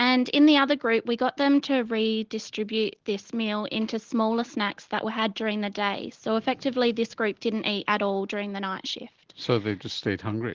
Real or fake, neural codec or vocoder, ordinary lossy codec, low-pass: real; none; Opus, 16 kbps; 7.2 kHz